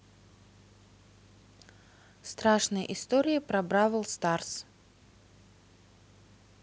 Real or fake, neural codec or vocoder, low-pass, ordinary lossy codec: real; none; none; none